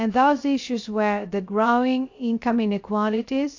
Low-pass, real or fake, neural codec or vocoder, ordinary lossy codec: 7.2 kHz; fake; codec, 16 kHz, 0.3 kbps, FocalCodec; AAC, 48 kbps